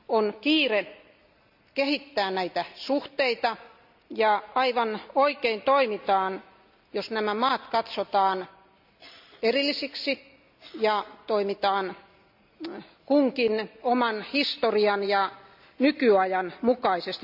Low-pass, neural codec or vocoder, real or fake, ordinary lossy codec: 5.4 kHz; none; real; none